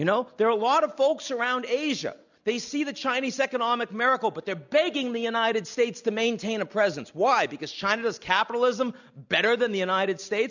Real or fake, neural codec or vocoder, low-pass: real; none; 7.2 kHz